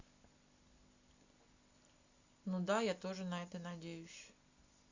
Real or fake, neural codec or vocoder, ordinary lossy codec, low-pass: real; none; Opus, 64 kbps; 7.2 kHz